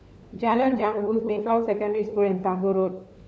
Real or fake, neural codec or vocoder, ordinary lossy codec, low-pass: fake; codec, 16 kHz, 4 kbps, FunCodec, trained on LibriTTS, 50 frames a second; none; none